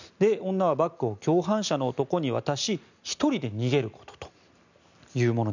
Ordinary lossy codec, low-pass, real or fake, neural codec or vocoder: none; 7.2 kHz; real; none